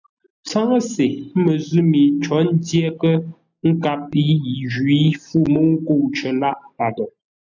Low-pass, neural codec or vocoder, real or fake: 7.2 kHz; none; real